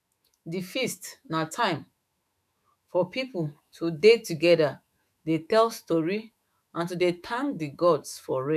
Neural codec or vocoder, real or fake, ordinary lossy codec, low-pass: autoencoder, 48 kHz, 128 numbers a frame, DAC-VAE, trained on Japanese speech; fake; none; 14.4 kHz